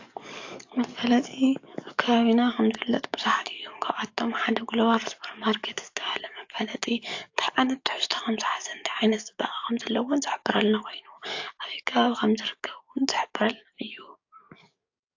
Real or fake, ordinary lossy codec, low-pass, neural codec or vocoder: fake; AAC, 48 kbps; 7.2 kHz; codec, 44.1 kHz, 7.8 kbps, DAC